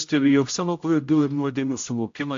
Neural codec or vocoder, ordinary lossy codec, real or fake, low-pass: codec, 16 kHz, 0.5 kbps, X-Codec, HuBERT features, trained on general audio; AAC, 48 kbps; fake; 7.2 kHz